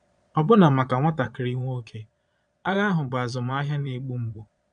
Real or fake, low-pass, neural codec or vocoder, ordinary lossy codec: fake; 9.9 kHz; vocoder, 22.05 kHz, 80 mel bands, Vocos; none